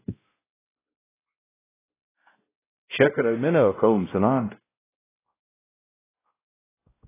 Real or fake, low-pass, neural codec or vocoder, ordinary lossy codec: fake; 3.6 kHz; codec, 16 kHz, 0.5 kbps, X-Codec, WavLM features, trained on Multilingual LibriSpeech; AAC, 16 kbps